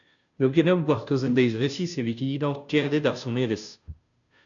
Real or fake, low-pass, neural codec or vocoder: fake; 7.2 kHz; codec, 16 kHz, 0.5 kbps, FunCodec, trained on Chinese and English, 25 frames a second